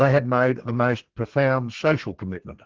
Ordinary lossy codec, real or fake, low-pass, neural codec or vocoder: Opus, 16 kbps; fake; 7.2 kHz; codec, 32 kHz, 1.9 kbps, SNAC